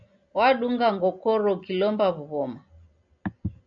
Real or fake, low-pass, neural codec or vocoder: real; 7.2 kHz; none